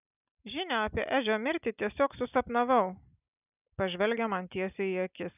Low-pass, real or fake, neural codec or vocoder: 3.6 kHz; real; none